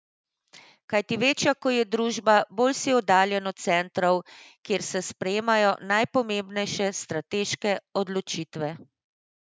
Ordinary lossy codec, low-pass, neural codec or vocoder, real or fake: none; none; none; real